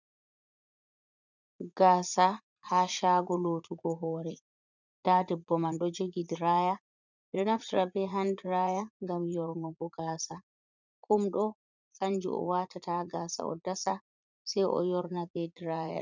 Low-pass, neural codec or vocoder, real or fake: 7.2 kHz; vocoder, 44.1 kHz, 80 mel bands, Vocos; fake